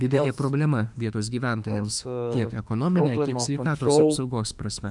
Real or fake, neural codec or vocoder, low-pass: fake; autoencoder, 48 kHz, 32 numbers a frame, DAC-VAE, trained on Japanese speech; 10.8 kHz